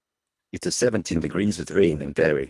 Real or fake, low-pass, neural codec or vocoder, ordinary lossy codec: fake; none; codec, 24 kHz, 1.5 kbps, HILCodec; none